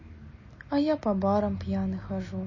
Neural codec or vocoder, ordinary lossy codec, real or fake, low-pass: none; MP3, 32 kbps; real; 7.2 kHz